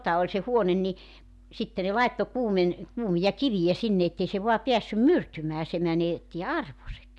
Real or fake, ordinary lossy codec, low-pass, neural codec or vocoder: real; none; none; none